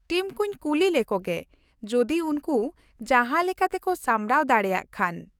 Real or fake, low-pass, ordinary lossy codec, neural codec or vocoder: fake; 19.8 kHz; none; codec, 44.1 kHz, 7.8 kbps, DAC